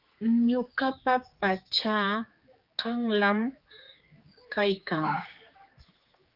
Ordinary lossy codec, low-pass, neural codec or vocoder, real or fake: Opus, 24 kbps; 5.4 kHz; codec, 16 kHz, 4 kbps, X-Codec, HuBERT features, trained on general audio; fake